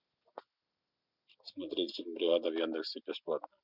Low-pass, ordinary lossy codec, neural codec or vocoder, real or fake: 5.4 kHz; none; none; real